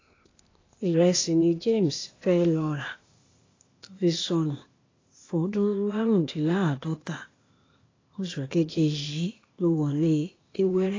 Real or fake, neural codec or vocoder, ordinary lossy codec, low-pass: fake; codec, 16 kHz, 0.8 kbps, ZipCodec; AAC, 32 kbps; 7.2 kHz